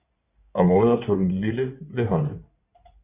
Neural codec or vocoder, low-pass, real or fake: codec, 16 kHz in and 24 kHz out, 2.2 kbps, FireRedTTS-2 codec; 3.6 kHz; fake